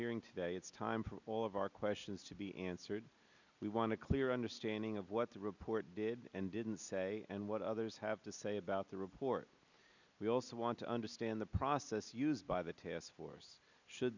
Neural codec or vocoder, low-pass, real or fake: none; 7.2 kHz; real